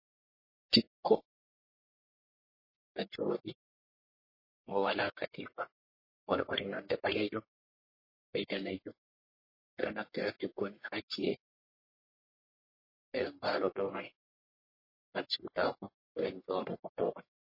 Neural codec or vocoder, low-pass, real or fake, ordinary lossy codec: codec, 44.1 kHz, 1.7 kbps, Pupu-Codec; 5.4 kHz; fake; MP3, 24 kbps